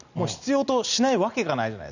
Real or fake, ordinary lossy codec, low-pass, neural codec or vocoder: real; none; 7.2 kHz; none